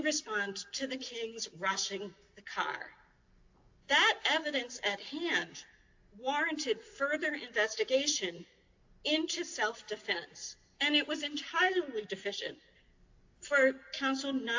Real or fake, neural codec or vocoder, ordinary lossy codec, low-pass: real; none; AAC, 48 kbps; 7.2 kHz